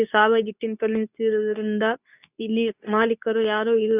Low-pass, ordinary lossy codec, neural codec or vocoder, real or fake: 3.6 kHz; none; codec, 24 kHz, 0.9 kbps, WavTokenizer, medium speech release version 2; fake